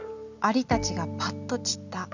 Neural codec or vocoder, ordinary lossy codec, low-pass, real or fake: none; none; 7.2 kHz; real